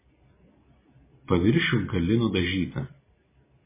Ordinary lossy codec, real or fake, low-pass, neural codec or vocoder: MP3, 16 kbps; real; 3.6 kHz; none